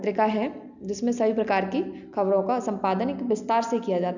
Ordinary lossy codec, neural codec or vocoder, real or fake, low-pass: none; none; real; 7.2 kHz